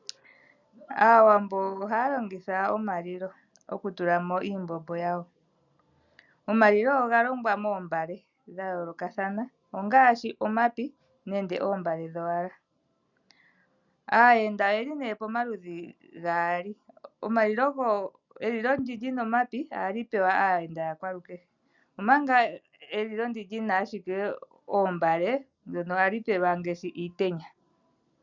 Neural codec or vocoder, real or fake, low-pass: none; real; 7.2 kHz